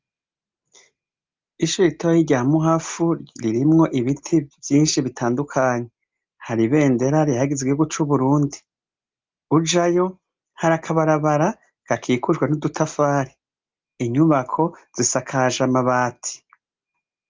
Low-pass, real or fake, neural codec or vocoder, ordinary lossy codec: 7.2 kHz; real; none; Opus, 32 kbps